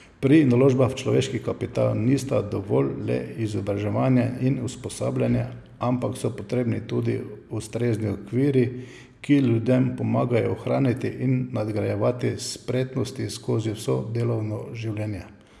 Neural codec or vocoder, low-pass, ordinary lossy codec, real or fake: none; none; none; real